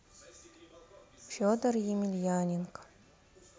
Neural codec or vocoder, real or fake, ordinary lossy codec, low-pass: none; real; none; none